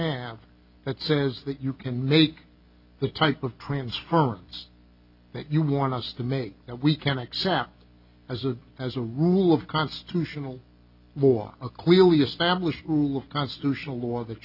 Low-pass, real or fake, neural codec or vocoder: 5.4 kHz; real; none